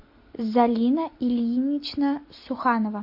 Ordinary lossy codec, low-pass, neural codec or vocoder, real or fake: MP3, 32 kbps; 5.4 kHz; none; real